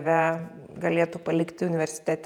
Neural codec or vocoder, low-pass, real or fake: vocoder, 48 kHz, 128 mel bands, Vocos; 19.8 kHz; fake